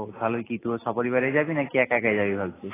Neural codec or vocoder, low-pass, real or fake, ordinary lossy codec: none; 3.6 kHz; real; AAC, 16 kbps